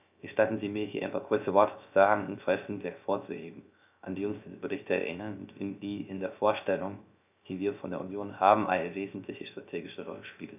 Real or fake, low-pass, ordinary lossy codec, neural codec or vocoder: fake; 3.6 kHz; none; codec, 16 kHz, 0.3 kbps, FocalCodec